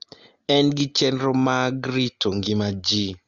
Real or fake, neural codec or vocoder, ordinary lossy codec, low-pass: real; none; Opus, 32 kbps; 7.2 kHz